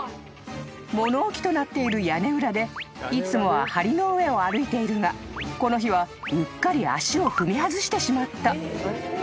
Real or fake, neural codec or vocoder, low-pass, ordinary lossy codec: real; none; none; none